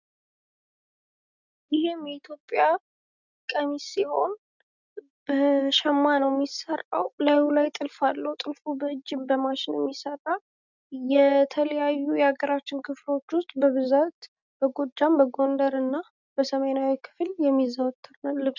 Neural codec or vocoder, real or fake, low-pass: none; real; 7.2 kHz